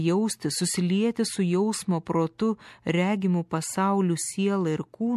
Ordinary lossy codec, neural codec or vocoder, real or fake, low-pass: MP3, 64 kbps; none; real; 14.4 kHz